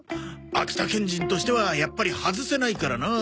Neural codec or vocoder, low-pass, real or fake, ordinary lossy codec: none; none; real; none